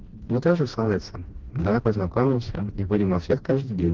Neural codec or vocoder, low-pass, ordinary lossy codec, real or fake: codec, 16 kHz, 1 kbps, FreqCodec, smaller model; 7.2 kHz; Opus, 16 kbps; fake